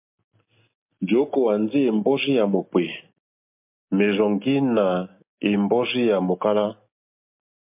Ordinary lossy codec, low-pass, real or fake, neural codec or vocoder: MP3, 32 kbps; 3.6 kHz; real; none